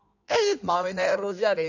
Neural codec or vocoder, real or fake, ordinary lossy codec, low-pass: codec, 16 kHz in and 24 kHz out, 1.1 kbps, FireRedTTS-2 codec; fake; none; 7.2 kHz